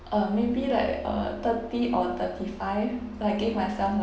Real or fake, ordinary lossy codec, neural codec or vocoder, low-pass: real; none; none; none